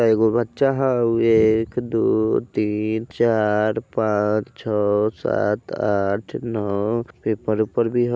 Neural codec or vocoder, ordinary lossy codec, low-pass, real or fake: none; none; none; real